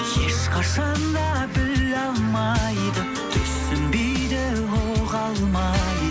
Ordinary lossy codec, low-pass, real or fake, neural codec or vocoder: none; none; real; none